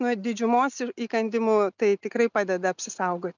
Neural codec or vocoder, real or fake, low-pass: none; real; 7.2 kHz